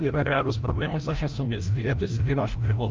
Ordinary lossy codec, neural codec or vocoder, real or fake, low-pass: Opus, 24 kbps; codec, 16 kHz, 0.5 kbps, FreqCodec, larger model; fake; 7.2 kHz